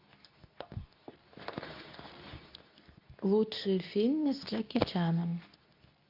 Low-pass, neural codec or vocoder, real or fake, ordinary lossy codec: 5.4 kHz; codec, 24 kHz, 0.9 kbps, WavTokenizer, medium speech release version 2; fake; AAC, 48 kbps